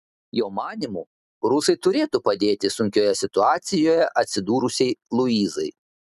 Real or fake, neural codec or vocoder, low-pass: real; none; 14.4 kHz